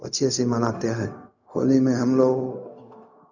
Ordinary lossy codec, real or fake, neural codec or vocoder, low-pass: none; fake; codec, 16 kHz, 0.4 kbps, LongCat-Audio-Codec; 7.2 kHz